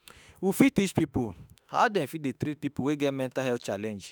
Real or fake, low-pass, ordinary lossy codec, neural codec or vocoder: fake; none; none; autoencoder, 48 kHz, 32 numbers a frame, DAC-VAE, trained on Japanese speech